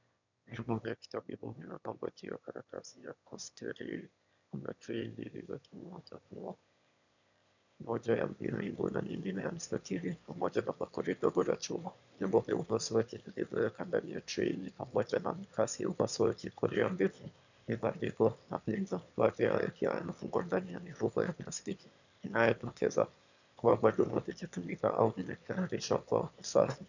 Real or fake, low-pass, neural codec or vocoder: fake; 7.2 kHz; autoencoder, 22.05 kHz, a latent of 192 numbers a frame, VITS, trained on one speaker